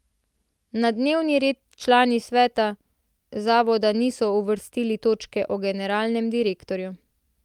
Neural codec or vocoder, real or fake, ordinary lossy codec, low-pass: none; real; Opus, 32 kbps; 19.8 kHz